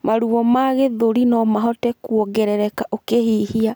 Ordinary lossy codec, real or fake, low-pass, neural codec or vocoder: none; real; none; none